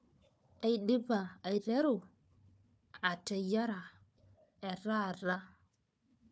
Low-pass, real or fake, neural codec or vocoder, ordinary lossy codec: none; fake; codec, 16 kHz, 4 kbps, FunCodec, trained on Chinese and English, 50 frames a second; none